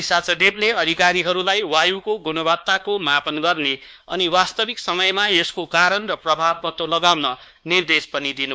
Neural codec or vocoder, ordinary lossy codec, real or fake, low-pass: codec, 16 kHz, 2 kbps, X-Codec, WavLM features, trained on Multilingual LibriSpeech; none; fake; none